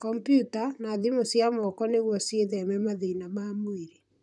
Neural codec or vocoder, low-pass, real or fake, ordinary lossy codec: vocoder, 44.1 kHz, 128 mel bands, Pupu-Vocoder; 10.8 kHz; fake; none